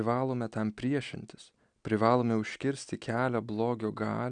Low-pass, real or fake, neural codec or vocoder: 9.9 kHz; real; none